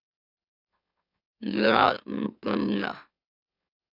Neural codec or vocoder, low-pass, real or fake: autoencoder, 44.1 kHz, a latent of 192 numbers a frame, MeloTTS; 5.4 kHz; fake